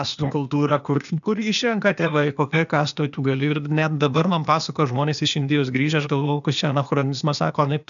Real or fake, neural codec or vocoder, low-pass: fake; codec, 16 kHz, 0.8 kbps, ZipCodec; 7.2 kHz